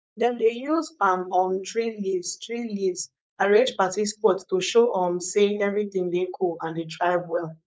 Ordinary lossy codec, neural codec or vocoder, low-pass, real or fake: none; codec, 16 kHz, 4.8 kbps, FACodec; none; fake